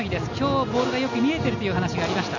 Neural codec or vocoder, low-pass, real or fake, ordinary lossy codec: none; 7.2 kHz; real; none